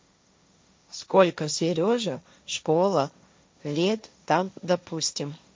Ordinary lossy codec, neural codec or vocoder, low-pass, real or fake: none; codec, 16 kHz, 1.1 kbps, Voila-Tokenizer; none; fake